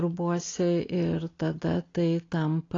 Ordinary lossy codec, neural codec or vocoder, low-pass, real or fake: AAC, 32 kbps; none; 7.2 kHz; real